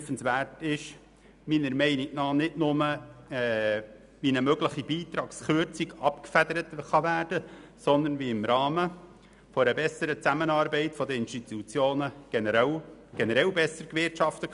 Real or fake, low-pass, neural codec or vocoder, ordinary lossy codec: real; 10.8 kHz; none; none